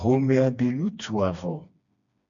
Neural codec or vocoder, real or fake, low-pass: codec, 16 kHz, 2 kbps, FreqCodec, smaller model; fake; 7.2 kHz